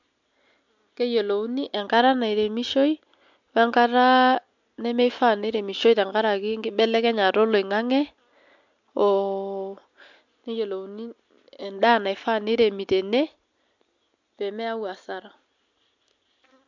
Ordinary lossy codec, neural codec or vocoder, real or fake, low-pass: MP3, 64 kbps; none; real; 7.2 kHz